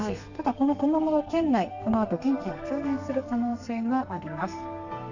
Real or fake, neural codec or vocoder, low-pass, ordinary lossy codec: fake; codec, 32 kHz, 1.9 kbps, SNAC; 7.2 kHz; none